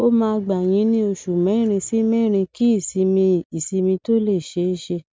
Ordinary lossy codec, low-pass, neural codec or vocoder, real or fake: none; none; none; real